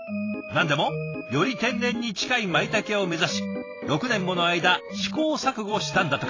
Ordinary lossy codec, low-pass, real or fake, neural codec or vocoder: AAC, 32 kbps; 7.2 kHz; fake; vocoder, 44.1 kHz, 128 mel bands every 256 samples, BigVGAN v2